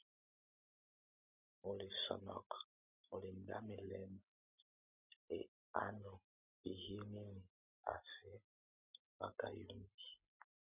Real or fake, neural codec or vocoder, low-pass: real; none; 3.6 kHz